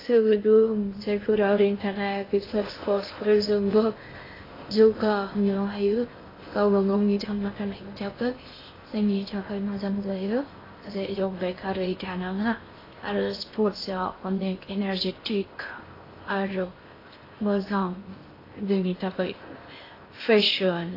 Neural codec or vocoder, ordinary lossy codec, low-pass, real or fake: codec, 16 kHz in and 24 kHz out, 0.6 kbps, FocalCodec, streaming, 2048 codes; AAC, 24 kbps; 5.4 kHz; fake